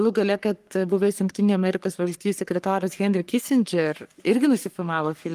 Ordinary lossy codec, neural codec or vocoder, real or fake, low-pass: Opus, 32 kbps; codec, 44.1 kHz, 3.4 kbps, Pupu-Codec; fake; 14.4 kHz